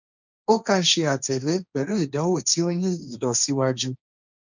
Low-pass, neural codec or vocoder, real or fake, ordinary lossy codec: 7.2 kHz; codec, 16 kHz, 1.1 kbps, Voila-Tokenizer; fake; none